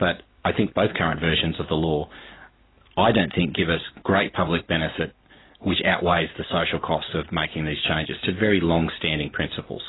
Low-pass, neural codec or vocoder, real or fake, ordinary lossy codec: 7.2 kHz; none; real; AAC, 16 kbps